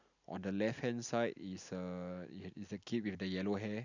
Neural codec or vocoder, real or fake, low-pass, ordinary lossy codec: none; real; 7.2 kHz; none